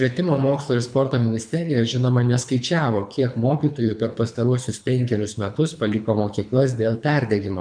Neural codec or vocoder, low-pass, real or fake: codec, 24 kHz, 3 kbps, HILCodec; 9.9 kHz; fake